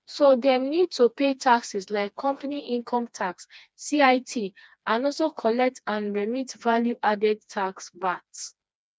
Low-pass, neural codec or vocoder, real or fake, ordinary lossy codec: none; codec, 16 kHz, 2 kbps, FreqCodec, smaller model; fake; none